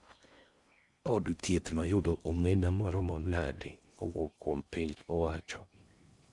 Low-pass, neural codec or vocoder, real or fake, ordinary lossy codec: 10.8 kHz; codec, 16 kHz in and 24 kHz out, 0.6 kbps, FocalCodec, streaming, 4096 codes; fake; Opus, 64 kbps